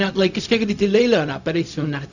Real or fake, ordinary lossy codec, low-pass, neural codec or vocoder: fake; none; 7.2 kHz; codec, 16 kHz, 0.4 kbps, LongCat-Audio-Codec